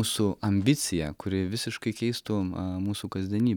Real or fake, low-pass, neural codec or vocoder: real; 19.8 kHz; none